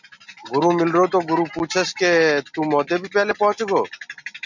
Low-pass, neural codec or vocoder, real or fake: 7.2 kHz; none; real